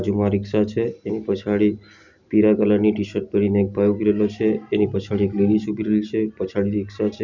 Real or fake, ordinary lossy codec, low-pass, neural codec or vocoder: real; none; 7.2 kHz; none